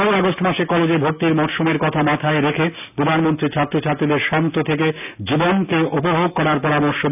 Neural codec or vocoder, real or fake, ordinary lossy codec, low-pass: none; real; none; 3.6 kHz